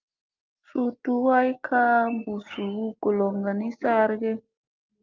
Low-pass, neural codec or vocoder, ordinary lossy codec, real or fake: 7.2 kHz; none; Opus, 24 kbps; real